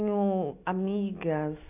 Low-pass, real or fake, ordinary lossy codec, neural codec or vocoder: 3.6 kHz; real; AAC, 24 kbps; none